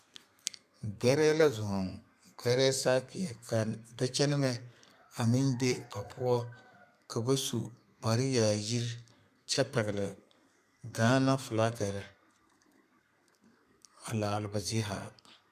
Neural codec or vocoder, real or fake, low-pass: codec, 32 kHz, 1.9 kbps, SNAC; fake; 14.4 kHz